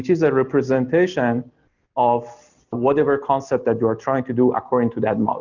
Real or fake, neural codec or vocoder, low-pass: real; none; 7.2 kHz